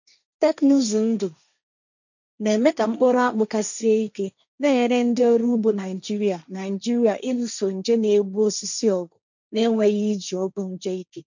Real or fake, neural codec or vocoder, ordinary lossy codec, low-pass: fake; codec, 16 kHz, 1.1 kbps, Voila-Tokenizer; none; 7.2 kHz